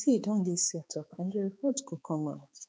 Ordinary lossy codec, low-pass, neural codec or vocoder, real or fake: none; none; codec, 16 kHz, 4 kbps, X-Codec, HuBERT features, trained on balanced general audio; fake